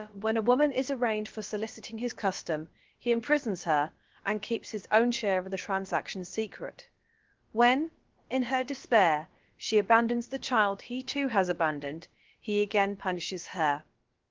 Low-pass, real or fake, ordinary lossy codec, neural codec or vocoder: 7.2 kHz; fake; Opus, 16 kbps; codec, 16 kHz, about 1 kbps, DyCAST, with the encoder's durations